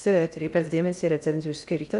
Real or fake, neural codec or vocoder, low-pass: fake; codec, 16 kHz in and 24 kHz out, 0.6 kbps, FocalCodec, streaming, 2048 codes; 10.8 kHz